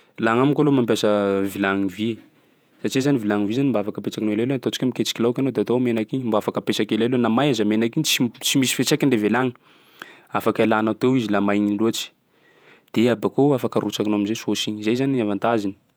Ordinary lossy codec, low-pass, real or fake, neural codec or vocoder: none; none; fake; vocoder, 48 kHz, 128 mel bands, Vocos